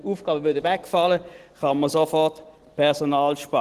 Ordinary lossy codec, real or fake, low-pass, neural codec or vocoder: Opus, 16 kbps; real; 14.4 kHz; none